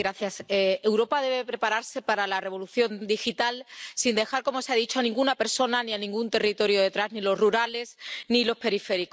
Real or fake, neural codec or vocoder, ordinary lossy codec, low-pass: real; none; none; none